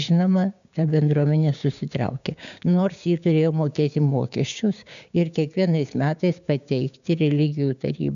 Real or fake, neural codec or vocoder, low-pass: fake; codec, 16 kHz, 6 kbps, DAC; 7.2 kHz